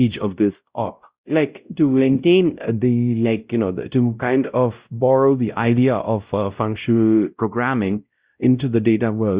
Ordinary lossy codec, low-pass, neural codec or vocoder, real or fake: Opus, 64 kbps; 3.6 kHz; codec, 16 kHz, 0.5 kbps, X-Codec, WavLM features, trained on Multilingual LibriSpeech; fake